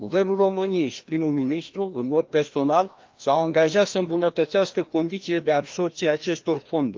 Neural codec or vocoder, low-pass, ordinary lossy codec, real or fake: codec, 16 kHz, 1 kbps, FreqCodec, larger model; 7.2 kHz; Opus, 32 kbps; fake